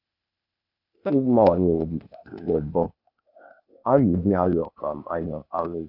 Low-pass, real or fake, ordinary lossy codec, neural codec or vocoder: 5.4 kHz; fake; none; codec, 16 kHz, 0.8 kbps, ZipCodec